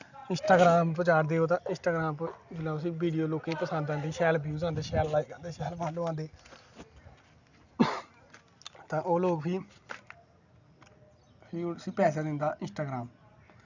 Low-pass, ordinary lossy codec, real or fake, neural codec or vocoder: 7.2 kHz; none; real; none